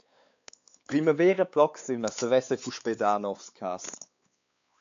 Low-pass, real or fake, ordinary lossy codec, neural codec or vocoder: 7.2 kHz; fake; AAC, 64 kbps; codec, 16 kHz, 4 kbps, X-Codec, WavLM features, trained on Multilingual LibriSpeech